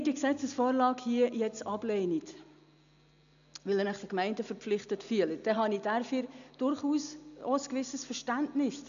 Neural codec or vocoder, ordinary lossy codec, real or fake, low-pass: none; none; real; 7.2 kHz